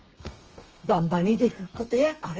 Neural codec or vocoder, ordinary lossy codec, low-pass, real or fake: codec, 32 kHz, 1.9 kbps, SNAC; Opus, 24 kbps; 7.2 kHz; fake